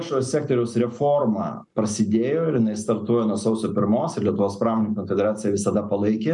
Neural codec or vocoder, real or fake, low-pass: none; real; 10.8 kHz